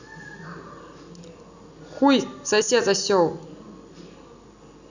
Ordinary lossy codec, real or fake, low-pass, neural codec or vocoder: none; real; 7.2 kHz; none